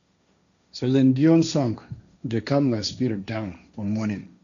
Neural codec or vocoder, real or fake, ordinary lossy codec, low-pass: codec, 16 kHz, 1.1 kbps, Voila-Tokenizer; fake; none; 7.2 kHz